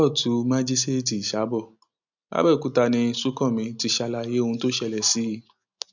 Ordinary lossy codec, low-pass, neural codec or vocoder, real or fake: none; 7.2 kHz; none; real